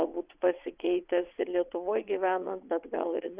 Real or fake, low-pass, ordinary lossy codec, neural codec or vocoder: fake; 3.6 kHz; Opus, 64 kbps; vocoder, 22.05 kHz, 80 mel bands, WaveNeXt